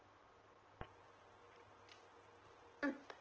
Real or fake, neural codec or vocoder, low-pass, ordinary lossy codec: real; none; 7.2 kHz; Opus, 16 kbps